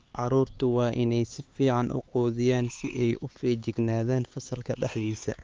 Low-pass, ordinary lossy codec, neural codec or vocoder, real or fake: 7.2 kHz; Opus, 32 kbps; codec, 16 kHz, 4 kbps, X-Codec, HuBERT features, trained on LibriSpeech; fake